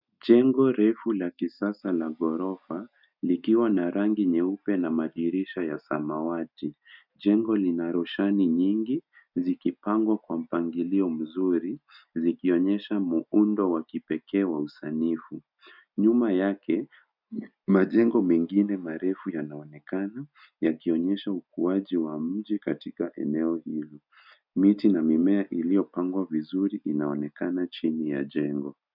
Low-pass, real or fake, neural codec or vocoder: 5.4 kHz; real; none